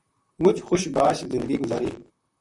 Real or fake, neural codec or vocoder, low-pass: fake; vocoder, 44.1 kHz, 128 mel bands, Pupu-Vocoder; 10.8 kHz